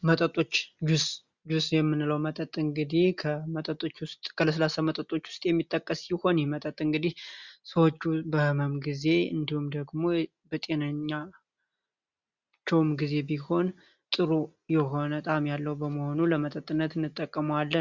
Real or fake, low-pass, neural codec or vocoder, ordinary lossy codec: real; 7.2 kHz; none; Opus, 64 kbps